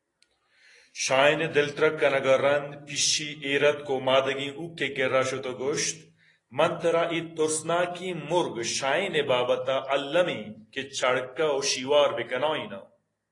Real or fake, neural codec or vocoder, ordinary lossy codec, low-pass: real; none; AAC, 32 kbps; 10.8 kHz